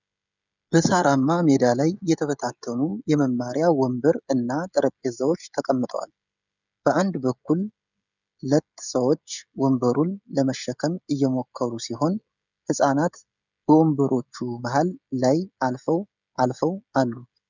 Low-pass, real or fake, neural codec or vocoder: 7.2 kHz; fake; codec, 16 kHz, 16 kbps, FreqCodec, smaller model